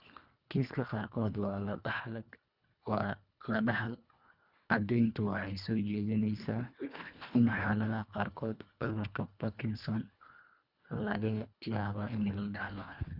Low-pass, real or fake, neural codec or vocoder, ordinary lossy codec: 5.4 kHz; fake; codec, 24 kHz, 1.5 kbps, HILCodec; Opus, 64 kbps